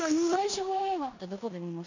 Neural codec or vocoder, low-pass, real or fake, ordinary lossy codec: codec, 16 kHz in and 24 kHz out, 0.9 kbps, LongCat-Audio-Codec, four codebook decoder; 7.2 kHz; fake; none